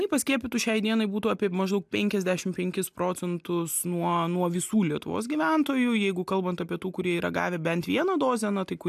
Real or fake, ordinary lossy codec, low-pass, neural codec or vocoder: real; AAC, 96 kbps; 14.4 kHz; none